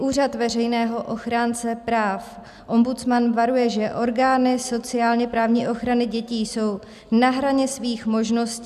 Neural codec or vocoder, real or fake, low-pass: none; real; 14.4 kHz